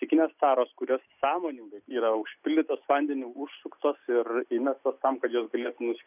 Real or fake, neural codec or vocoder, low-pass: real; none; 3.6 kHz